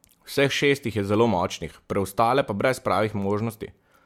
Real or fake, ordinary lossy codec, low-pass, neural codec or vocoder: real; MP3, 96 kbps; 19.8 kHz; none